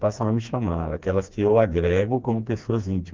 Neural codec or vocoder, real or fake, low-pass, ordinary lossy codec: codec, 16 kHz, 2 kbps, FreqCodec, smaller model; fake; 7.2 kHz; Opus, 32 kbps